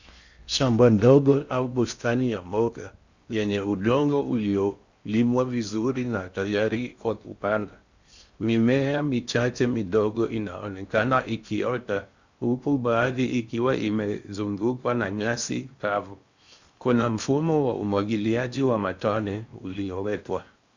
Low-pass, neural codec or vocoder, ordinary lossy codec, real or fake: 7.2 kHz; codec, 16 kHz in and 24 kHz out, 0.6 kbps, FocalCodec, streaming, 4096 codes; Opus, 64 kbps; fake